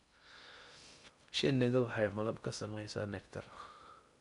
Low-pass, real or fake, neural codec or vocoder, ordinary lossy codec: 10.8 kHz; fake; codec, 16 kHz in and 24 kHz out, 0.6 kbps, FocalCodec, streaming, 4096 codes; none